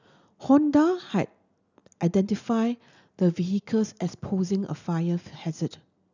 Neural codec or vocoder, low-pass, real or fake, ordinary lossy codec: none; 7.2 kHz; real; none